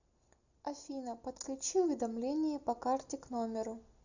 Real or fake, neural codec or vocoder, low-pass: real; none; 7.2 kHz